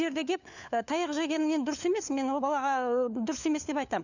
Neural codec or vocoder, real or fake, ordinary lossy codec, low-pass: codec, 16 kHz, 4 kbps, FunCodec, trained on LibriTTS, 50 frames a second; fake; none; 7.2 kHz